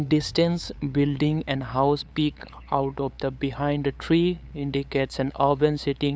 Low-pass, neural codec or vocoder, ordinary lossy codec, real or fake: none; codec, 16 kHz, 8 kbps, FunCodec, trained on LibriTTS, 25 frames a second; none; fake